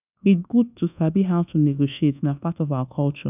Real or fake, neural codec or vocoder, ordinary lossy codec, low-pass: fake; codec, 24 kHz, 1.2 kbps, DualCodec; none; 3.6 kHz